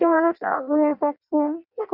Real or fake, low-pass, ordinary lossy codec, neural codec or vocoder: fake; 5.4 kHz; none; codec, 16 kHz in and 24 kHz out, 0.6 kbps, FireRedTTS-2 codec